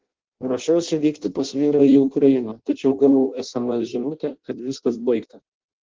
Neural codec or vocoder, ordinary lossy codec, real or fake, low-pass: codec, 16 kHz in and 24 kHz out, 0.6 kbps, FireRedTTS-2 codec; Opus, 16 kbps; fake; 7.2 kHz